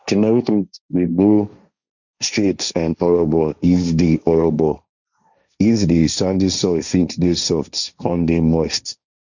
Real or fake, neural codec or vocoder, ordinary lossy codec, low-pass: fake; codec, 16 kHz, 1.1 kbps, Voila-Tokenizer; none; 7.2 kHz